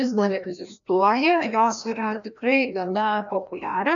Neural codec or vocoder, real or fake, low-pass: codec, 16 kHz, 1 kbps, FreqCodec, larger model; fake; 7.2 kHz